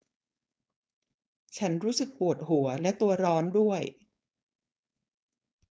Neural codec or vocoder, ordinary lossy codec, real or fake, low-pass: codec, 16 kHz, 4.8 kbps, FACodec; none; fake; none